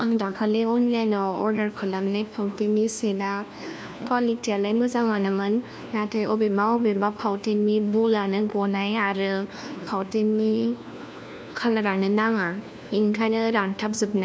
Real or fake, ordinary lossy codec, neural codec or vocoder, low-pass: fake; none; codec, 16 kHz, 1 kbps, FunCodec, trained on LibriTTS, 50 frames a second; none